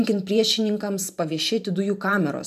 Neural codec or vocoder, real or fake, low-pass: vocoder, 44.1 kHz, 128 mel bands every 512 samples, BigVGAN v2; fake; 14.4 kHz